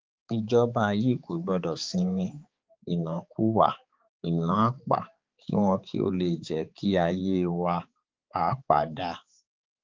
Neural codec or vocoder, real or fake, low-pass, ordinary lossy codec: codec, 16 kHz, 4 kbps, X-Codec, HuBERT features, trained on balanced general audio; fake; 7.2 kHz; Opus, 32 kbps